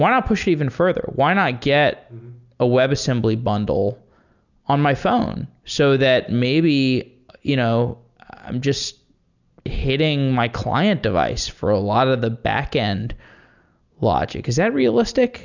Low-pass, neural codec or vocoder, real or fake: 7.2 kHz; none; real